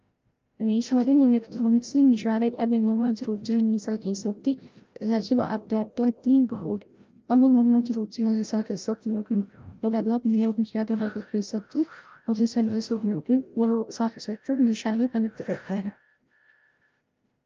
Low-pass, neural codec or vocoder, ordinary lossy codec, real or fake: 7.2 kHz; codec, 16 kHz, 0.5 kbps, FreqCodec, larger model; Opus, 24 kbps; fake